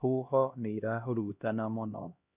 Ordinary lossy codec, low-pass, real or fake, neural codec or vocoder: none; 3.6 kHz; fake; codec, 16 kHz, 1 kbps, X-Codec, HuBERT features, trained on LibriSpeech